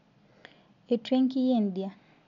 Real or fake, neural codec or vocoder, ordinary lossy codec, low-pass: real; none; none; 7.2 kHz